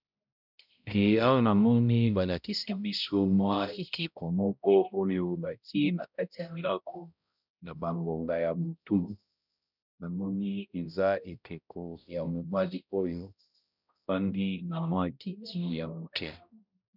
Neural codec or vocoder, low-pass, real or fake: codec, 16 kHz, 0.5 kbps, X-Codec, HuBERT features, trained on balanced general audio; 5.4 kHz; fake